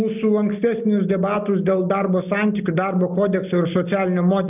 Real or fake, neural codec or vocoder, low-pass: real; none; 3.6 kHz